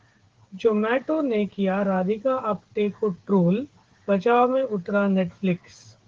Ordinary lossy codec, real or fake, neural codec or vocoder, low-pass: Opus, 16 kbps; fake; codec, 16 kHz, 8 kbps, FreqCodec, smaller model; 7.2 kHz